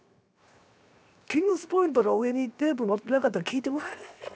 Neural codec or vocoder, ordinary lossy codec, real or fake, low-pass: codec, 16 kHz, 0.7 kbps, FocalCodec; none; fake; none